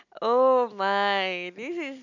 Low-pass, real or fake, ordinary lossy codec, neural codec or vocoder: 7.2 kHz; real; none; none